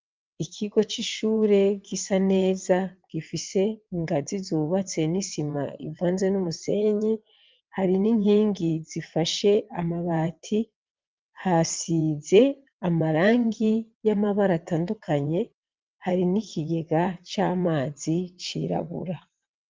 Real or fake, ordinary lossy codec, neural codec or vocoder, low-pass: fake; Opus, 24 kbps; vocoder, 22.05 kHz, 80 mel bands, WaveNeXt; 7.2 kHz